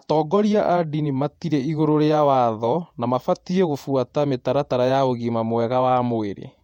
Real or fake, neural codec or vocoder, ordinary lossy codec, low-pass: fake; vocoder, 48 kHz, 128 mel bands, Vocos; MP3, 64 kbps; 19.8 kHz